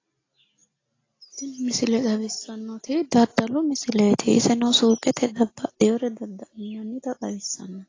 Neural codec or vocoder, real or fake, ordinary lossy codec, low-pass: none; real; AAC, 32 kbps; 7.2 kHz